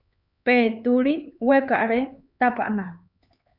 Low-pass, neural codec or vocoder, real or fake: 5.4 kHz; codec, 16 kHz, 4 kbps, X-Codec, HuBERT features, trained on LibriSpeech; fake